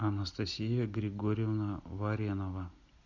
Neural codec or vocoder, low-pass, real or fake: none; 7.2 kHz; real